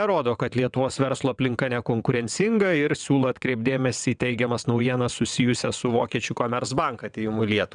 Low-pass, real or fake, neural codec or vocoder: 9.9 kHz; fake; vocoder, 22.05 kHz, 80 mel bands, WaveNeXt